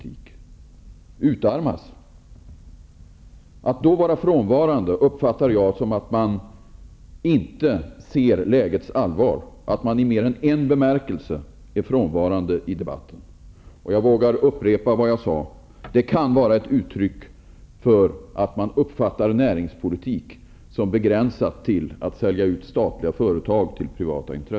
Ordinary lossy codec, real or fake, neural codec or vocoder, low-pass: none; real; none; none